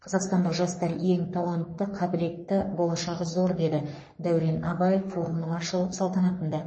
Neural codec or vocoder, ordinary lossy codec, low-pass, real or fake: codec, 44.1 kHz, 3.4 kbps, Pupu-Codec; MP3, 32 kbps; 9.9 kHz; fake